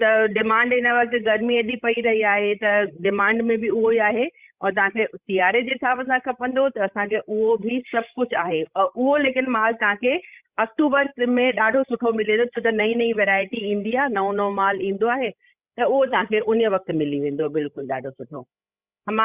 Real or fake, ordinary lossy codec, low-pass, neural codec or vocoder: fake; Opus, 64 kbps; 3.6 kHz; codec, 16 kHz, 16 kbps, FreqCodec, larger model